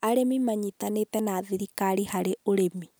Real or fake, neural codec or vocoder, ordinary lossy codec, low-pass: real; none; none; none